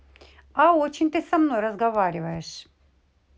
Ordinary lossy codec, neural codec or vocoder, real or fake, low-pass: none; none; real; none